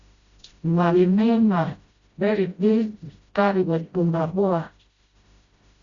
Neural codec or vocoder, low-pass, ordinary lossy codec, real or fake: codec, 16 kHz, 0.5 kbps, FreqCodec, smaller model; 7.2 kHz; Opus, 64 kbps; fake